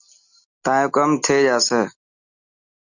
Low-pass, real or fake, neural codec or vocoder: 7.2 kHz; real; none